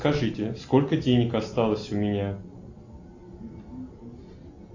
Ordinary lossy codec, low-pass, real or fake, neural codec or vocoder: AAC, 48 kbps; 7.2 kHz; real; none